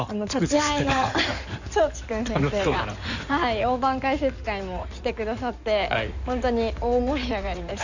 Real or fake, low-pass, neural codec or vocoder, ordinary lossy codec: fake; 7.2 kHz; codec, 16 kHz in and 24 kHz out, 2.2 kbps, FireRedTTS-2 codec; none